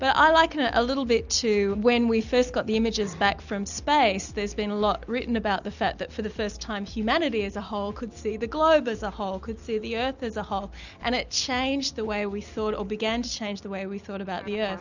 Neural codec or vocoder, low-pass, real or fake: none; 7.2 kHz; real